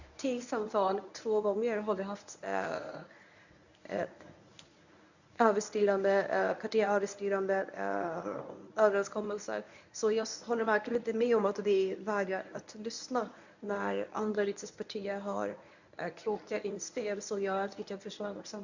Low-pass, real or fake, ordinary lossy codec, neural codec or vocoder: 7.2 kHz; fake; none; codec, 24 kHz, 0.9 kbps, WavTokenizer, medium speech release version 1